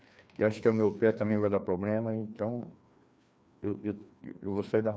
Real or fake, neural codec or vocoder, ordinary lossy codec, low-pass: fake; codec, 16 kHz, 2 kbps, FreqCodec, larger model; none; none